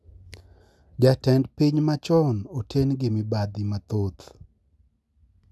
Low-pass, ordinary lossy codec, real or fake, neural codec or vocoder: none; none; real; none